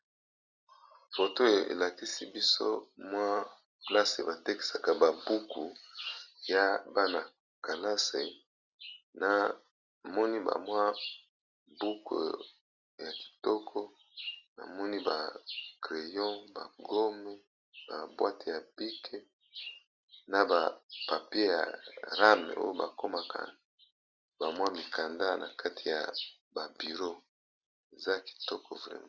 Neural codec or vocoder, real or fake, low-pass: none; real; 7.2 kHz